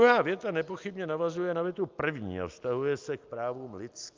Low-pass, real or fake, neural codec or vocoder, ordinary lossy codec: 7.2 kHz; real; none; Opus, 24 kbps